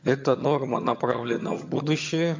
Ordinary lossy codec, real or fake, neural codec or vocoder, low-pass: MP3, 48 kbps; fake; vocoder, 22.05 kHz, 80 mel bands, HiFi-GAN; 7.2 kHz